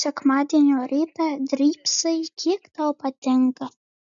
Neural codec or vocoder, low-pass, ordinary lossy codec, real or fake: none; 7.2 kHz; MP3, 96 kbps; real